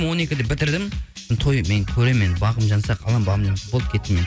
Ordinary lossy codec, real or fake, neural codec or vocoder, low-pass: none; real; none; none